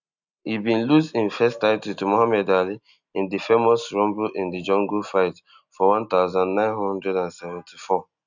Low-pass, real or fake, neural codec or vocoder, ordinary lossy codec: 7.2 kHz; real; none; none